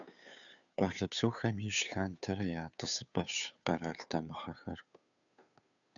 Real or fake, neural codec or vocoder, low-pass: fake; codec, 16 kHz, 2 kbps, FunCodec, trained on Chinese and English, 25 frames a second; 7.2 kHz